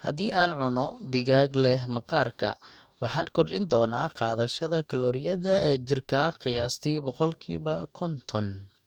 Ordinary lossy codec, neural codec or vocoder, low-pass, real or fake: none; codec, 44.1 kHz, 2.6 kbps, DAC; 19.8 kHz; fake